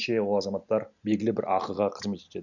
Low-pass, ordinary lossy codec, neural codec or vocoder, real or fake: 7.2 kHz; none; none; real